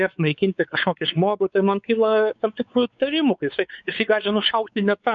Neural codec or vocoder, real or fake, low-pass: codec, 16 kHz, 4 kbps, X-Codec, WavLM features, trained on Multilingual LibriSpeech; fake; 7.2 kHz